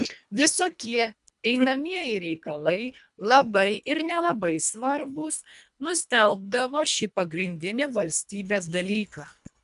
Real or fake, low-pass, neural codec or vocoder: fake; 10.8 kHz; codec, 24 kHz, 1.5 kbps, HILCodec